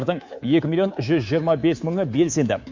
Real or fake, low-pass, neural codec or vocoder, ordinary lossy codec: fake; 7.2 kHz; codec, 24 kHz, 3.1 kbps, DualCodec; MP3, 48 kbps